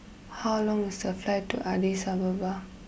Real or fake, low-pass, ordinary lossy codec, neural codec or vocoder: real; none; none; none